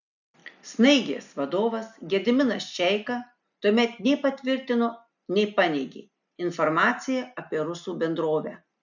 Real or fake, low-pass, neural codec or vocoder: real; 7.2 kHz; none